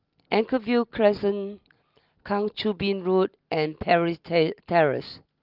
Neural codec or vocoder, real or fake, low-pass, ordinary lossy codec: none; real; 5.4 kHz; Opus, 32 kbps